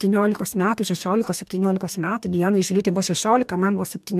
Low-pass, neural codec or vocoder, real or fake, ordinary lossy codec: 14.4 kHz; codec, 44.1 kHz, 2.6 kbps, DAC; fake; MP3, 96 kbps